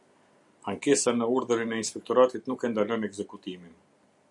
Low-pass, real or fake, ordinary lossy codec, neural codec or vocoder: 10.8 kHz; real; MP3, 96 kbps; none